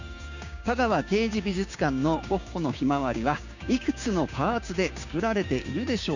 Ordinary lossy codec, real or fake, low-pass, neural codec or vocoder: none; fake; 7.2 kHz; codec, 16 kHz, 6 kbps, DAC